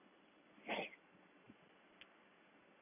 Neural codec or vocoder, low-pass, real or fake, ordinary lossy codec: none; 3.6 kHz; real; none